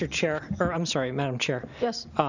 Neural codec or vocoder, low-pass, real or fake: none; 7.2 kHz; real